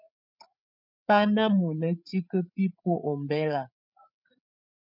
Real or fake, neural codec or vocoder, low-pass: fake; codec, 16 kHz, 8 kbps, FreqCodec, larger model; 5.4 kHz